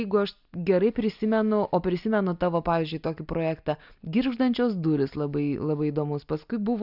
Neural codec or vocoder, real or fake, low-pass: none; real; 5.4 kHz